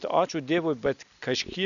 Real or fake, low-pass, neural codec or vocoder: real; 7.2 kHz; none